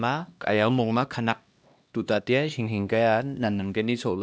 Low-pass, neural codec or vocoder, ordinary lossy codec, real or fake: none; codec, 16 kHz, 1 kbps, X-Codec, HuBERT features, trained on LibriSpeech; none; fake